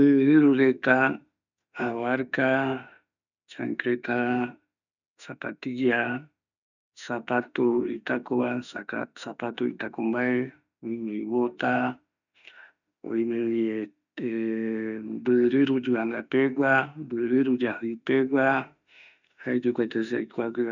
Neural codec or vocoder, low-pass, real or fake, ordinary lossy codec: autoencoder, 48 kHz, 32 numbers a frame, DAC-VAE, trained on Japanese speech; 7.2 kHz; fake; none